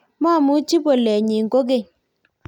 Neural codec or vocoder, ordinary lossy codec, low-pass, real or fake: none; none; 19.8 kHz; real